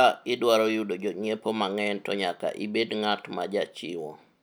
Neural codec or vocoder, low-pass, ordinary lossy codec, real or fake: none; none; none; real